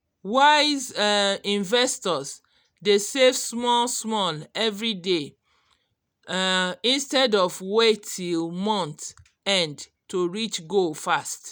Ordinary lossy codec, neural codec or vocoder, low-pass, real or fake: none; none; none; real